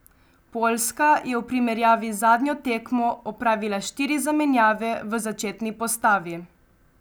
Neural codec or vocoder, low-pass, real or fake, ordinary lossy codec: none; none; real; none